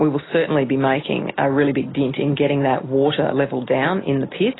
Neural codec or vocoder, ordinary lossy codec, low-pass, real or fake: none; AAC, 16 kbps; 7.2 kHz; real